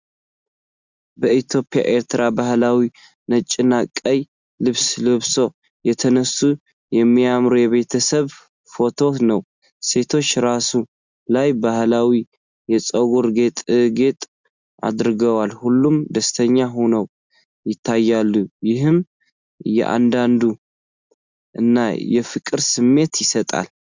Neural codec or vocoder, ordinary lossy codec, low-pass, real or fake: none; Opus, 64 kbps; 7.2 kHz; real